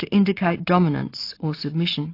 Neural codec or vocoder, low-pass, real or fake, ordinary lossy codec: none; 5.4 kHz; real; AAC, 32 kbps